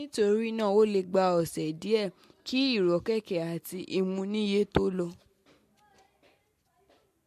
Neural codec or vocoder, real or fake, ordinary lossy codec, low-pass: none; real; MP3, 64 kbps; 14.4 kHz